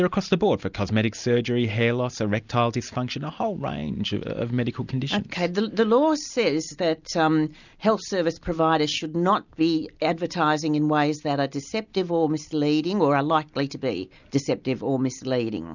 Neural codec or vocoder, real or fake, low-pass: none; real; 7.2 kHz